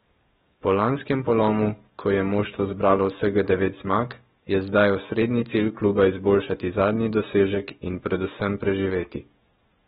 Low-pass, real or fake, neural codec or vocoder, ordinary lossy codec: 19.8 kHz; fake; codec, 44.1 kHz, 7.8 kbps, DAC; AAC, 16 kbps